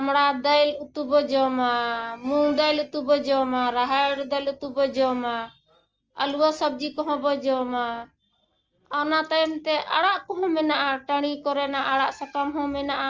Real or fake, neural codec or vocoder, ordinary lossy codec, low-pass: real; none; Opus, 24 kbps; 7.2 kHz